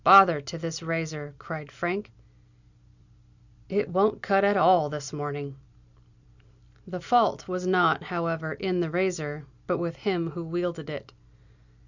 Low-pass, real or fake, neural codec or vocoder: 7.2 kHz; real; none